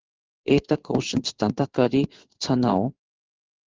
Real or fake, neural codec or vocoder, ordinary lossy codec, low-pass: fake; codec, 16 kHz in and 24 kHz out, 1 kbps, XY-Tokenizer; Opus, 16 kbps; 7.2 kHz